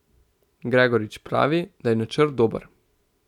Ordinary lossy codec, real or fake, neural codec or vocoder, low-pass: none; real; none; 19.8 kHz